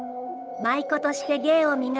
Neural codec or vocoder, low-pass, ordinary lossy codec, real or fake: codec, 16 kHz, 8 kbps, FunCodec, trained on Chinese and English, 25 frames a second; none; none; fake